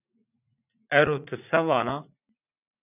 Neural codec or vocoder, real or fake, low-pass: vocoder, 44.1 kHz, 80 mel bands, Vocos; fake; 3.6 kHz